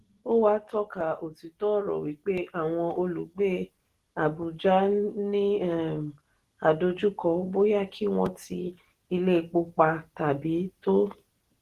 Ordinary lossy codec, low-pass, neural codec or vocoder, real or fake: Opus, 16 kbps; 14.4 kHz; none; real